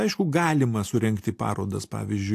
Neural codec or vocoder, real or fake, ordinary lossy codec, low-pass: none; real; AAC, 64 kbps; 14.4 kHz